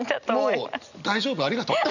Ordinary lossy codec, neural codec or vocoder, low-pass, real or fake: none; none; 7.2 kHz; real